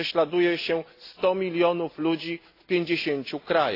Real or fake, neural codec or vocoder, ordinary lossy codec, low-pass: real; none; AAC, 32 kbps; 5.4 kHz